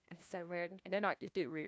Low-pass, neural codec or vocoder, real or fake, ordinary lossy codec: none; codec, 16 kHz, 1 kbps, FunCodec, trained on LibriTTS, 50 frames a second; fake; none